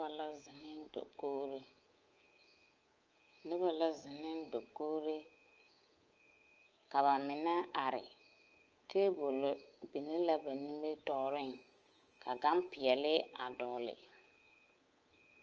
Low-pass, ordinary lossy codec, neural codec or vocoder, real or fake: 7.2 kHz; Opus, 24 kbps; codec, 24 kHz, 3.1 kbps, DualCodec; fake